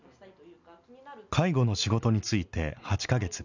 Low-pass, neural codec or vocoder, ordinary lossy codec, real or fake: 7.2 kHz; none; none; real